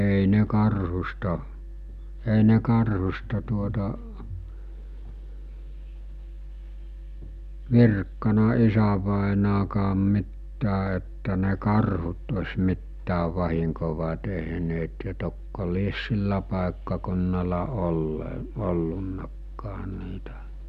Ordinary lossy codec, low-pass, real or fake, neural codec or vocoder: none; 14.4 kHz; real; none